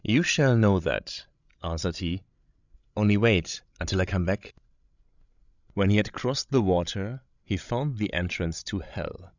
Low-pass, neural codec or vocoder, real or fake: 7.2 kHz; codec, 16 kHz, 16 kbps, FreqCodec, larger model; fake